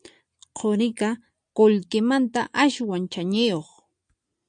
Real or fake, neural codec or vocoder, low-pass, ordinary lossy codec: real; none; 9.9 kHz; MP3, 96 kbps